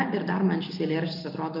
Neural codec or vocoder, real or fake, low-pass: none; real; 5.4 kHz